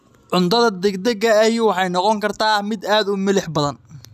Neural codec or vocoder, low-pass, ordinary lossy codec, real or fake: none; 14.4 kHz; none; real